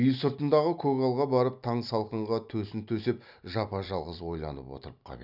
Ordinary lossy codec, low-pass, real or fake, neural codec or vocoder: none; 5.4 kHz; real; none